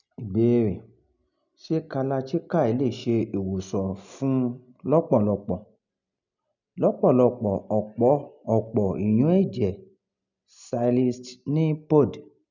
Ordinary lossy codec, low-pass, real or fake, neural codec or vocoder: none; 7.2 kHz; real; none